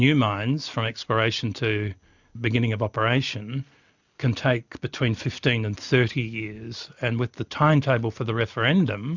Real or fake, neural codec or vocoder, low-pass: real; none; 7.2 kHz